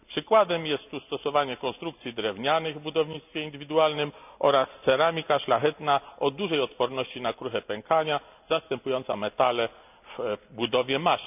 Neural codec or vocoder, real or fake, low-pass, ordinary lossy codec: none; real; 3.6 kHz; none